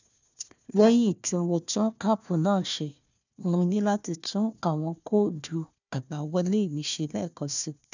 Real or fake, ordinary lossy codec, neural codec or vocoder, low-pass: fake; none; codec, 16 kHz, 1 kbps, FunCodec, trained on Chinese and English, 50 frames a second; 7.2 kHz